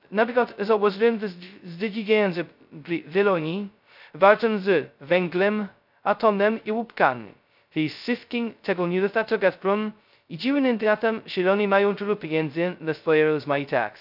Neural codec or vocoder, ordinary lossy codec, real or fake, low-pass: codec, 16 kHz, 0.2 kbps, FocalCodec; none; fake; 5.4 kHz